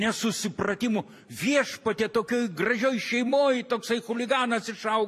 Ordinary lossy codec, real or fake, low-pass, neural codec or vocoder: AAC, 96 kbps; fake; 14.4 kHz; vocoder, 44.1 kHz, 128 mel bands every 256 samples, BigVGAN v2